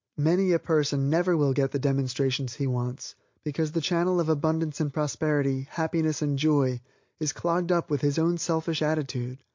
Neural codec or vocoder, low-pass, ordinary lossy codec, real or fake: none; 7.2 kHz; MP3, 48 kbps; real